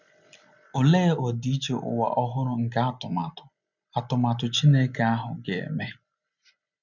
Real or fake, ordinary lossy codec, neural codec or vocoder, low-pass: real; none; none; 7.2 kHz